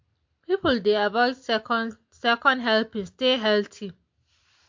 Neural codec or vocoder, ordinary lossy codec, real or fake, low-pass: none; MP3, 48 kbps; real; 7.2 kHz